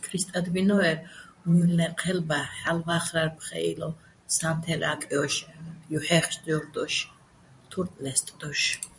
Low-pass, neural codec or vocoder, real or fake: 10.8 kHz; vocoder, 44.1 kHz, 128 mel bands every 512 samples, BigVGAN v2; fake